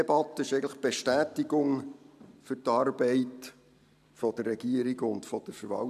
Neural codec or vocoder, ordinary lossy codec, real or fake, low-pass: vocoder, 44.1 kHz, 128 mel bands every 512 samples, BigVGAN v2; none; fake; 14.4 kHz